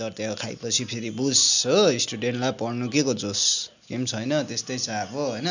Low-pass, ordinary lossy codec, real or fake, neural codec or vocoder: 7.2 kHz; none; real; none